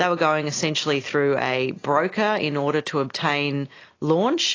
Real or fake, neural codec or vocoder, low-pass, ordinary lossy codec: real; none; 7.2 kHz; AAC, 32 kbps